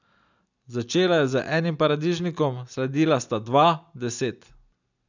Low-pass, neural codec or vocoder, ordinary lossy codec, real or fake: 7.2 kHz; none; none; real